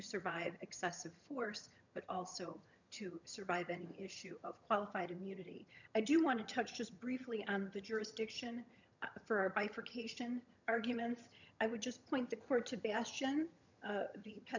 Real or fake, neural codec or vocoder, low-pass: fake; vocoder, 22.05 kHz, 80 mel bands, HiFi-GAN; 7.2 kHz